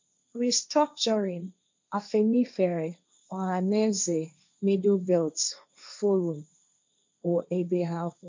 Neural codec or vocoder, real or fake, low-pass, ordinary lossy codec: codec, 16 kHz, 1.1 kbps, Voila-Tokenizer; fake; 7.2 kHz; MP3, 64 kbps